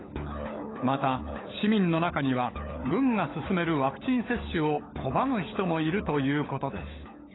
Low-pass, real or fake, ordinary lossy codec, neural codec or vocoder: 7.2 kHz; fake; AAC, 16 kbps; codec, 16 kHz, 16 kbps, FunCodec, trained on LibriTTS, 50 frames a second